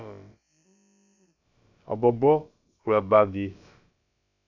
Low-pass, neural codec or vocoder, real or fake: 7.2 kHz; codec, 16 kHz, about 1 kbps, DyCAST, with the encoder's durations; fake